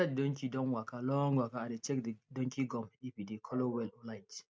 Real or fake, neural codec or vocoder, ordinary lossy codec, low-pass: real; none; none; none